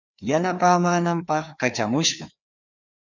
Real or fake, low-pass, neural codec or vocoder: fake; 7.2 kHz; codec, 16 kHz, 2 kbps, FreqCodec, larger model